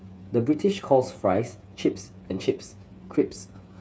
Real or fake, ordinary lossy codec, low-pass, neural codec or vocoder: fake; none; none; codec, 16 kHz, 8 kbps, FreqCodec, smaller model